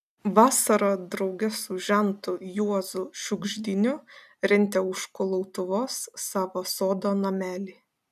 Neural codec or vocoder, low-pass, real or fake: none; 14.4 kHz; real